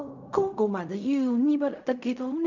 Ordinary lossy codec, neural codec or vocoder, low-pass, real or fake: none; codec, 16 kHz in and 24 kHz out, 0.4 kbps, LongCat-Audio-Codec, fine tuned four codebook decoder; 7.2 kHz; fake